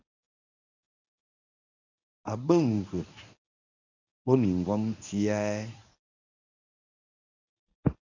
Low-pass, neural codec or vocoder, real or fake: 7.2 kHz; codec, 24 kHz, 0.9 kbps, WavTokenizer, medium speech release version 1; fake